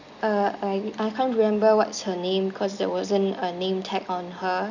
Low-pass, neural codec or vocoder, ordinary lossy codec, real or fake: 7.2 kHz; none; none; real